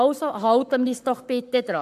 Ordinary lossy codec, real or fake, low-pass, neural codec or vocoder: MP3, 96 kbps; fake; 14.4 kHz; codec, 44.1 kHz, 7.8 kbps, Pupu-Codec